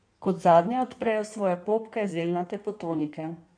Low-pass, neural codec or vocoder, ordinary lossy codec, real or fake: 9.9 kHz; codec, 16 kHz in and 24 kHz out, 1.1 kbps, FireRedTTS-2 codec; MP3, 96 kbps; fake